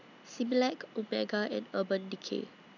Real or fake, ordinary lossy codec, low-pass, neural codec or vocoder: real; none; 7.2 kHz; none